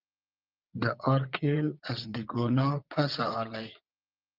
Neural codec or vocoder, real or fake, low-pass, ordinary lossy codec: vocoder, 22.05 kHz, 80 mel bands, WaveNeXt; fake; 5.4 kHz; Opus, 32 kbps